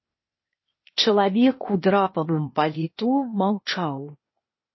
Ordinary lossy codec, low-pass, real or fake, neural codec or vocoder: MP3, 24 kbps; 7.2 kHz; fake; codec, 16 kHz, 0.8 kbps, ZipCodec